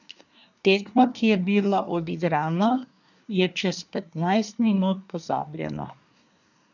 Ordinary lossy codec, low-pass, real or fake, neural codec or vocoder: none; 7.2 kHz; fake; codec, 24 kHz, 1 kbps, SNAC